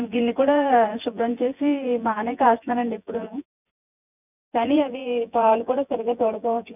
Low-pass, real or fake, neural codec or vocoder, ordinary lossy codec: 3.6 kHz; fake; vocoder, 24 kHz, 100 mel bands, Vocos; none